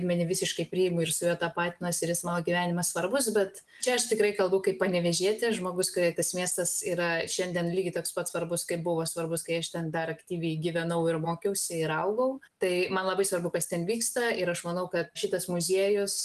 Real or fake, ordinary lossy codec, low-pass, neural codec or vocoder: real; Opus, 24 kbps; 14.4 kHz; none